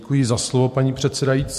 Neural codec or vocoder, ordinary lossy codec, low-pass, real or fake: vocoder, 44.1 kHz, 128 mel bands every 256 samples, BigVGAN v2; MP3, 64 kbps; 14.4 kHz; fake